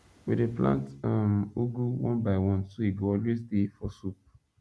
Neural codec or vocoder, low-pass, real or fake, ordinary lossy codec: none; none; real; none